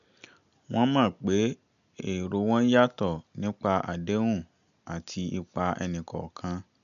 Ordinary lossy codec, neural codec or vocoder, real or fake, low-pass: none; none; real; 7.2 kHz